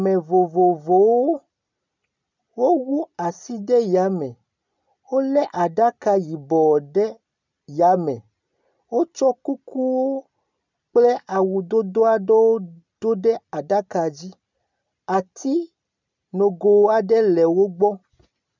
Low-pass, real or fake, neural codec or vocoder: 7.2 kHz; real; none